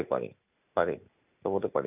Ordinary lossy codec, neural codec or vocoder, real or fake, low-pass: none; none; real; 3.6 kHz